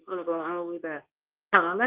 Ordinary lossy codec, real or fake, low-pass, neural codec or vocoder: Opus, 24 kbps; fake; 3.6 kHz; codec, 24 kHz, 0.9 kbps, WavTokenizer, medium speech release version 2